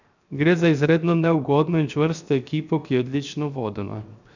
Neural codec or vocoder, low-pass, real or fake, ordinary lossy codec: codec, 16 kHz, 0.7 kbps, FocalCodec; 7.2 kHz; fake; none